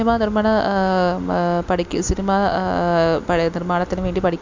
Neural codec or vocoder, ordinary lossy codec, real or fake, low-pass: none; MP3, 64 kbps; real; 7.2 kHz